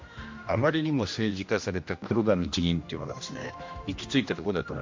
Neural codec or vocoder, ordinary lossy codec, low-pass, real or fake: codec, 16 kHz, 1 kbps, X-Codec, HuBERT features, trained on general audio; MP3, 48 kbps; 7.2 kHz; fake